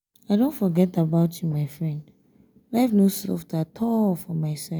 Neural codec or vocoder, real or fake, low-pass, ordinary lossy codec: none; real; none; none